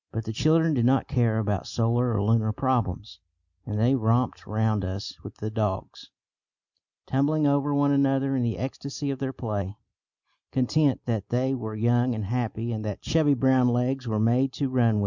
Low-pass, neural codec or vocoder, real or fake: 7.2 kHz; none; real